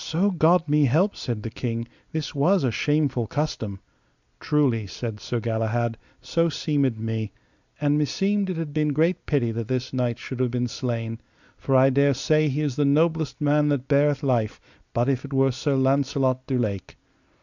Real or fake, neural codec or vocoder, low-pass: real; none; 7.2 kHz